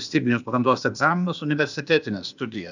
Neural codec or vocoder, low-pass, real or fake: codec, 16 kHz, 0.8 kbps, ZipCodec; 7.2 kHz; fake